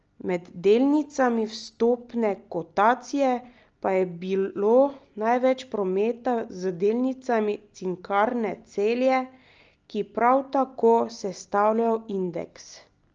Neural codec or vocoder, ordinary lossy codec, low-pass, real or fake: none; Opus, 24 kbps; 7.2 kHz; real